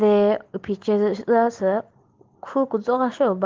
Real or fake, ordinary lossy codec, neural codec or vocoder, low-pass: real; Opus, 16 kbps; none; 7.2 kHz